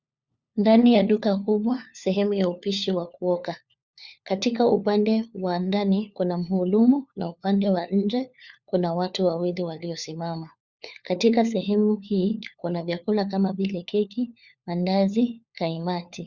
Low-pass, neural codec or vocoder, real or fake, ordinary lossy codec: 7.2 kHz; codec, 16 kHz, 4 kbps, FunCodec, trained on LibriTTS, 50 frames a second; fake; Opus, 64 kbps